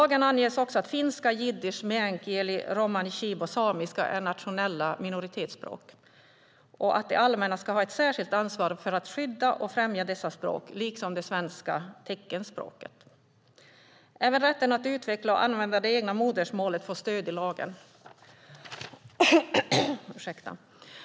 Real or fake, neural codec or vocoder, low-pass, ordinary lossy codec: real; none; none; none